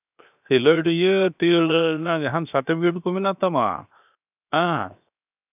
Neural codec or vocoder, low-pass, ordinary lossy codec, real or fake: codec, 16 kHz, 0.7 kbps, FocalCodec; 3.6 kHz; none; fake